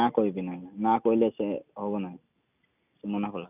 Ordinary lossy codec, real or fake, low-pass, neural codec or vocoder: none; real; 3.6 kHz; none